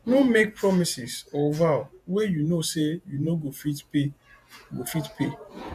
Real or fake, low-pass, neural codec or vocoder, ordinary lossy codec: fake; 14.4 kHz; vocoder, 48 kHz, 128 mel bands, Vocos; AAC, 96 kbps